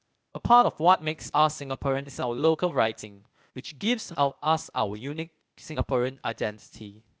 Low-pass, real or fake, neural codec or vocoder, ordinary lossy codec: none; fake; codec, 16 kHz, 0.8 kbps, ZipCodec; none